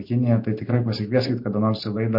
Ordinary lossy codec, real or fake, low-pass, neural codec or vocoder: MP3, 32 kbps; real; 5.4 kHz; none